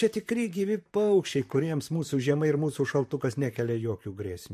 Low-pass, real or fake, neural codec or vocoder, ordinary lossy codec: 14.4 kHz; fake; vocoder, 44.1 kHz, 128 mel bands, Pupu-Vocoder; MP3, 64 kbps